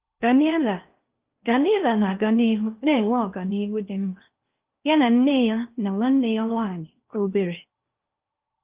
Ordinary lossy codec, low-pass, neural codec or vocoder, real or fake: Opus, 24 kbps; 3.6 kHz; codec, 16 kHz in and 24 kHz out, 0.8 kbps, FocalCodec, streaming, 65536 codes; fake